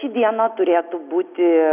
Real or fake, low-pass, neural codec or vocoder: real; 3.6 kHz; none